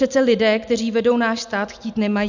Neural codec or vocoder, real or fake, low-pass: none; real; 7.2 kHz